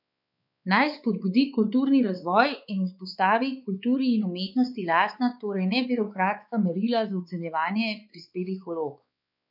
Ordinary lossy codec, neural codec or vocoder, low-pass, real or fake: none; codec, 16 kHz, 4 kbps, X-Codec, WavLM features, trained on Multilingual LibriSpeech; 5.4 kHz; fake